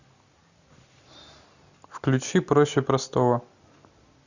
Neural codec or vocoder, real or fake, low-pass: none; real; 7.2 kHz